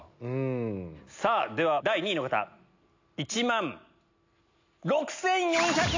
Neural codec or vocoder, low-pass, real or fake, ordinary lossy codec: none; 7.2 kHz; real; AAC, 48 kbps